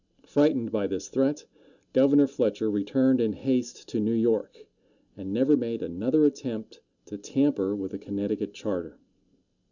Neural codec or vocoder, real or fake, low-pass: none; real; 7.2 kHz